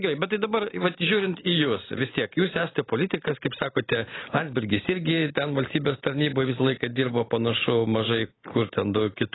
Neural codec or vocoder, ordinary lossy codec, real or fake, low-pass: none; AAC, 16 kbps; real; 7.2 kHz